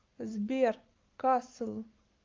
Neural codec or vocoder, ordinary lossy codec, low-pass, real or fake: codec, 44.1 kHz, 7.8 kbps, Pupu-Codec; Opus, 24 kbps; 7.2 kHz; fake